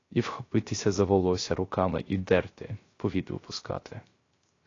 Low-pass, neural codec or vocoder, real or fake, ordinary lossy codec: 7.2 kHz; codec, 16 kHz, 0.3 kbps, FocalCodec; fake; AAC, 32 kbps